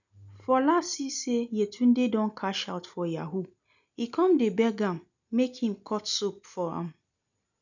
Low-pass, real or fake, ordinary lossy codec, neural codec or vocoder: 7.2 kHz; real; none; none